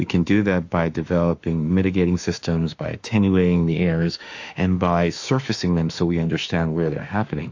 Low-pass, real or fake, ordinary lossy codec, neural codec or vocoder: 7.2 kHz; fake; AAC, 48 kbps; autoencoder, 48 kHz, 32 numbers a frame, DAC-VAE, trained on Japanese speech